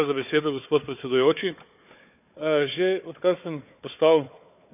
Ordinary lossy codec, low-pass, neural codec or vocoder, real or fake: none; 3.6 kHz; codec, 16 kHz, 4 kbps, FunCodec, trained on Chinese and English, 50 frames a second; fake